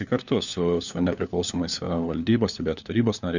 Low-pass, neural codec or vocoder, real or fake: 7.2 kHz; codec, 16 kHz, 4 kbps, FunCodec, trained on LibriTTS, 50 frames a second; fake